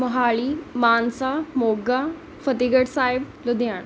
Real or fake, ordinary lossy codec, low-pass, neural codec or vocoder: real; none; none; none